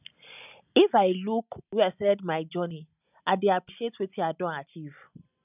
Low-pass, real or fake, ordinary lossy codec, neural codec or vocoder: 3.6 kHz; real; none; none